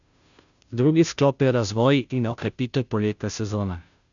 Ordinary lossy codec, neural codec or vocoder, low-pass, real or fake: none; codec, 16 kHz, 0.5 kbps, FunCodec, trained on Chinese and English, 25 frames a second; 7.2 kHz; fake